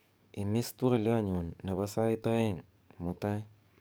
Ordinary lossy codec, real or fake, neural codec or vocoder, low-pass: none; fake; codec, 44.1 kHz, 7.8 kbps, DAC; none